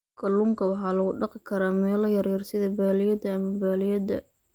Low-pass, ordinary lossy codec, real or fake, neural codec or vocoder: 19.8 kHz; Opus, 32 kbps; real; none